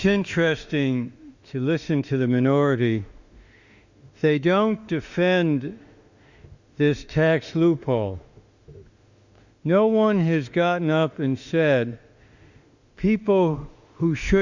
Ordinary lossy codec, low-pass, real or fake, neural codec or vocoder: Opus, 64 kbps; 7.2 kHz; fake; autoencoder, 48 kHz, 32 numbers a frame, DAC-VAE, trained on Japanese speech